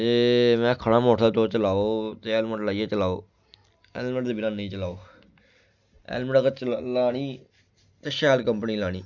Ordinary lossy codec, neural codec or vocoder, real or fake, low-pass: none; none; real; 7.2 kHz